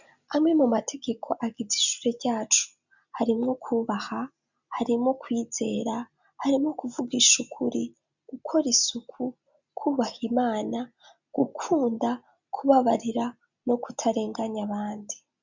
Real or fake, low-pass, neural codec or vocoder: real; 7.2 kHz; none